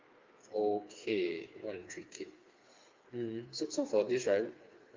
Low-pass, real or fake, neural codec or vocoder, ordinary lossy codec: 7.2 kHz; fake; codec, 16 kHz, 4 kbps, FreqCodec, smaller model; Opus, 24 kbps